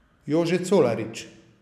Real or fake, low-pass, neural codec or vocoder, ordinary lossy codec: real; 14.4 kHz; none; none